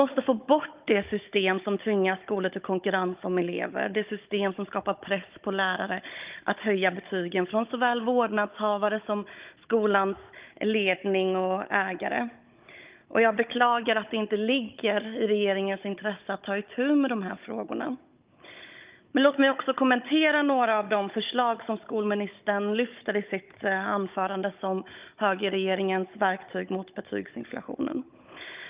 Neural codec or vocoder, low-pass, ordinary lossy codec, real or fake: codec, 16 kHz, 16 kbps, FunCodec, trained on Chinese and English, 50 frames a second; 3.6 kHz; Opus, 24 kbps; fake